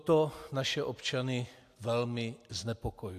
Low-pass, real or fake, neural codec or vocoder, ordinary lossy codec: 14.4 kHz; real; none; AAC, 64 kbps